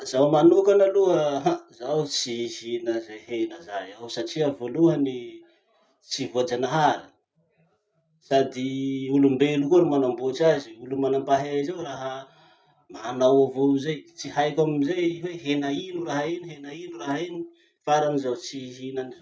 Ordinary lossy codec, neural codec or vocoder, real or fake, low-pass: none; none; real; none